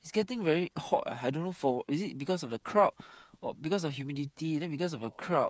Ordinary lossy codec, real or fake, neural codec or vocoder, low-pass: none; fake; codec, 16 kHz, 8 kbps, FreqCodec, smaller model; none